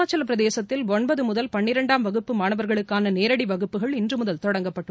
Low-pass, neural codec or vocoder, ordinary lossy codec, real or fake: none; none; none; real